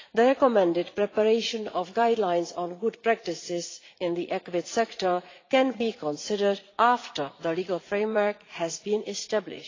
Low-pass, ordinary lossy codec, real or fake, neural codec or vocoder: 7.2 kHz; AAC, 32 kbps; fake; vocoder, 44.1 kHz, 80 mel bands, Vocos